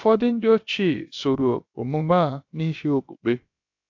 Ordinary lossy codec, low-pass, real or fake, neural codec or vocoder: AAC, 48 kbps; 7.2 kHz; fake; codec, 16 kHz, about 1 kbps, DyCAST, with the encoder's durations